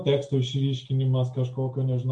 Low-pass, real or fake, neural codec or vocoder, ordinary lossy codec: 9.9 kHz; real; none; MP3, 64 kbps